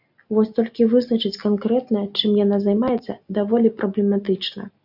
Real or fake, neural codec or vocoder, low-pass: real; none; 5.4 kHz